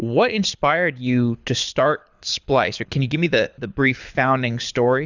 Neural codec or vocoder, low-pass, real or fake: codec, 24 kHz, 6 kbps, HILCodec; 7.2 kHz; fake